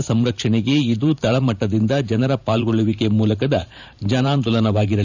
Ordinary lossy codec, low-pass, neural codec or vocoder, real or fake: none; 7.2 kHz; vocoder, 44.1 kHz, 128 mel bands every 512 samples, BigVGAN v2; fake